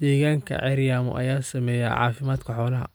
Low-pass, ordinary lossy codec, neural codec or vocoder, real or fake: none; none; none; real